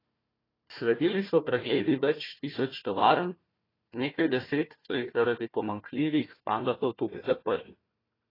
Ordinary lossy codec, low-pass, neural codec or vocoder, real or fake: AAC, 24 kbps; 5.4 kHz; codec, 16 kHz, 1 kbps, FunCodec, trained on Chinese and English, 50 frames a second; fake